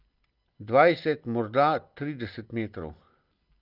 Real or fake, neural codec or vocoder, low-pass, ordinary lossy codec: real; none; 5.4 kHz; Opus, 32 kbps